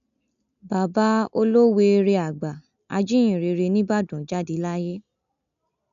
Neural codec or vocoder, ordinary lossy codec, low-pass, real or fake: none; none; 7.2 kHz; real